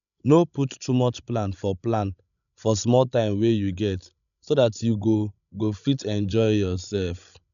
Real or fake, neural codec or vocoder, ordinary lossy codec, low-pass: fake; codec, 16 kHz, 16 kbps, FreqCodec, larger model; none; 7.2 kHz